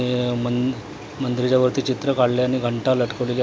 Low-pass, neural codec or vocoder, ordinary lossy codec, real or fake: none; none; none; real